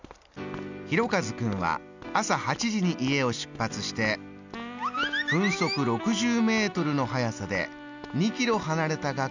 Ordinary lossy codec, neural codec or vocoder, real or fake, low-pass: none; none; real; 7.2 kHz